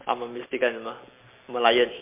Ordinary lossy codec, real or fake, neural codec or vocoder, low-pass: MP3, 24 kbps; real; none; 3.6 kHz